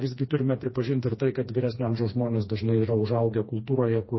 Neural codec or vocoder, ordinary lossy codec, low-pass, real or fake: codec, 16 kHz, 2 kbps, FreqCodec, smaller model; MP3, 24 kbps; 7.2 kHz; fake